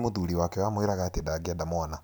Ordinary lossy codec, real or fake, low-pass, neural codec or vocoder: none; real; none; none